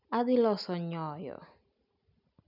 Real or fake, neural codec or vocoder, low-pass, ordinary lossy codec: real; none; 5.4 kHz; none